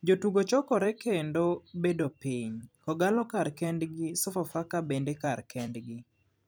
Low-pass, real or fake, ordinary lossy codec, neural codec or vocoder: none; fake; none; vocoder, 44.1 kHz, 128 mel bands every 256 samples, BigVGAN v2